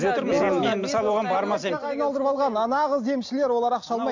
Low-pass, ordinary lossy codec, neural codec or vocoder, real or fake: 7.2 kHz; MP3, 48 kbps; none; real